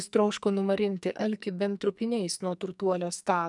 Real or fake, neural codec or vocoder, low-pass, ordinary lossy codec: fake; codec, 44.1 kHz, 2.6 kbps, SNAC; 10.8 kHz; MP3, 96 kbps